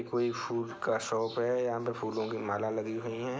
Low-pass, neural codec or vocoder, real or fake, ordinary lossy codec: none; none; real; none